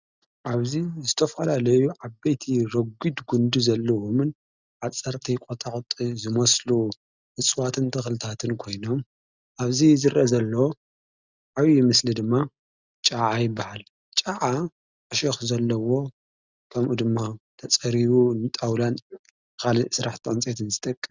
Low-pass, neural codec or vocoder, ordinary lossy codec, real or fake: 7.2 kHz; none; Opus, 64 kbps; real